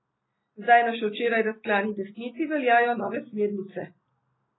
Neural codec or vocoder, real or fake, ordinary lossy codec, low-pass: none; real; AAC, 16 kbps; 7.2 kHz